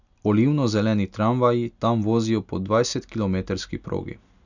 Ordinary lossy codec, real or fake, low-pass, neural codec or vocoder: none; real; 7.2 kHz; none